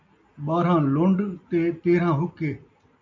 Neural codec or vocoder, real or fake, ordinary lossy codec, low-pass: none; real; MP3, 64 kbps; 7.2 kHz